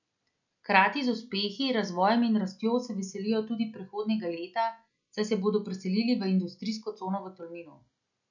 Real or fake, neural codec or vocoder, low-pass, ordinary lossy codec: real; none; 7.2 kHz; none